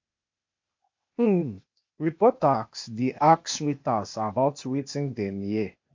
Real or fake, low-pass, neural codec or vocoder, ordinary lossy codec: fake; 7.2 kHz; codec, 16 kHz, 0.8 kbps, ZipCodec; MP3, 48 kbps